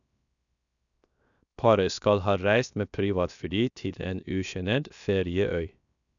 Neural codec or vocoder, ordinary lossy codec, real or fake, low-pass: codec, 16 kHz, 0.7 kbps, FocalCodec; none; fake; 7.2 kHz